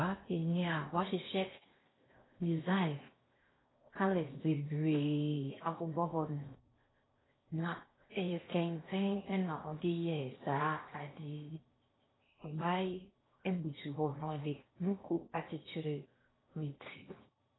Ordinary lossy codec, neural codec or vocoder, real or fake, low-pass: AAC, 16 kbps; codec, 16 kHz in and 24 kHz out, 0.8 kbps, FocalCodec, streaming, 65536 codes; fake; 7.2 kHz